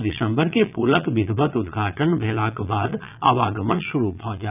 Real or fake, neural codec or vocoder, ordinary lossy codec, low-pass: fake; vocoder, 22.05 kHz, 80 mel bands, Vocos; none; 3.6 kHz